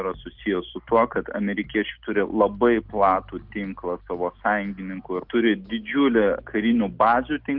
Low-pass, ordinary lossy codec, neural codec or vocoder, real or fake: 5.4 kHz; Opus, 32 kbps; none; real